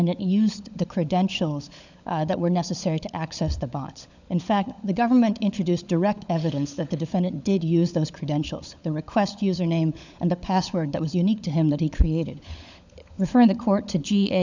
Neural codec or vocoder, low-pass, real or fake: codec, 16 kHz, 16 kbps, FunCodec, trained on LibriTTS, 50 frames a second; 7.2 kHz; fake